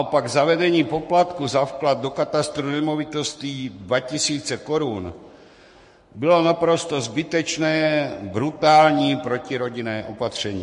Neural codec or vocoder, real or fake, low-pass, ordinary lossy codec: codec, 44.1 kHz, 7.8 kbps, Pupu-Codec; fake; 14.4 kHz; MP3, 48 kbps